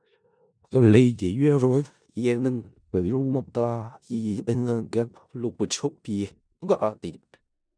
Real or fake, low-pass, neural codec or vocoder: fake; 9.9 kHz; codec, 16 kHz in and 24 kHz out, 0.4 kbps, LongCat-Audio-Codec, four codebook decoder